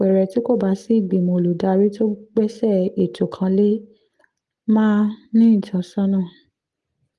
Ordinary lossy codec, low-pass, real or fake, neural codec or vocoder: Opus, 24 kbps; 10.8 kHz; real; none